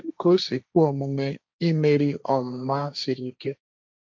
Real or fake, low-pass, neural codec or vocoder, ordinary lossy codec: fake; none; codec, 16 kHz, 1.1 kbps, Voila-Tokenizer; none